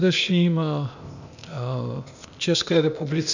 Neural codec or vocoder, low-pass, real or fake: codec, 16 kHz, 0.8 kbps, ZipCodec; 7.2 kHz; fake